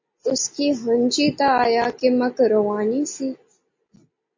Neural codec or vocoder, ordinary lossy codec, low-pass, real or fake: none; MP3, 32 kbps; 7.2 kHz; real